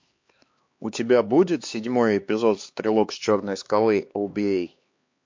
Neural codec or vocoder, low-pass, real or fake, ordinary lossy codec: codec, 16 kHz, 2 kbps, X-Codec, HuBERT features, trained on LibriSpeech; 7.2 kHz; fake; MP3, 48 kbps